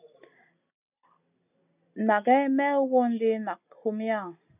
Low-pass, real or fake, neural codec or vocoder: 3.6 kHz; real; none